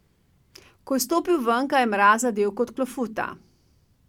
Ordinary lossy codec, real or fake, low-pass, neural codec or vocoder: none; real; 19.8 kHz; none